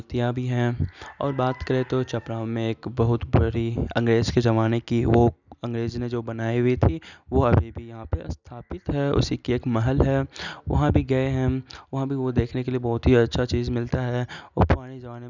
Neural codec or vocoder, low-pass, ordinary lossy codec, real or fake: none; 7.2 kHz; none; real